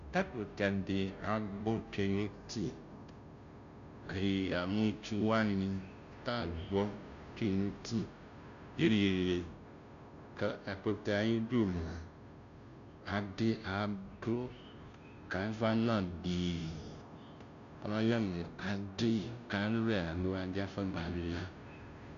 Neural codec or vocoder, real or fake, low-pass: codec, 16 kHz, 0.5 kbps, FunCodec, trained on Chinese and English, 25 frames a second; fake; 7.2 kHz